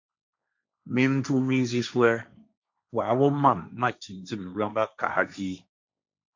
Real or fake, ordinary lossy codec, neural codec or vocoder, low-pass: fake; MP3, 64 kbps; codec, 16 kHz, 1.1 kbps, Voila-Tokenizer; 7.2 kHz